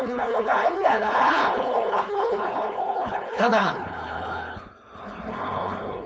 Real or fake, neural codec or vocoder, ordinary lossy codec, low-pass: fake; codec, 16 kHz, 4.8 kbps, FACodec; none; none